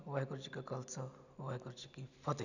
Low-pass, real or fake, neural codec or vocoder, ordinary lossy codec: 7.2 kHz; real; none; none